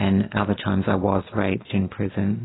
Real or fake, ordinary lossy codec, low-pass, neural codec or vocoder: real; AAC, 16 kbps; 7.2 kHz; none